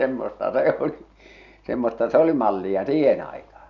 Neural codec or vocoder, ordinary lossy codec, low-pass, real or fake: none; none; 7.2 kHz; real